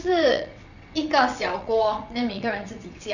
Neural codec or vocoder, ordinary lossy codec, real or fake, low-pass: vocoder, 22.05 kHz, 80 mel bands, WaveNeXt; none; fake; 7.2 kHz